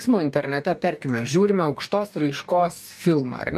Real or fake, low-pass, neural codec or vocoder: fake; 14.4 kHz; codec, 44.1 kHz, 2.6 kbps, DAC